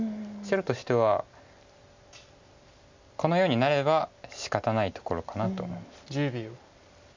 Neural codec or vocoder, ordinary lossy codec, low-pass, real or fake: none; MP3, 64 kbps; 7.2 kHz; real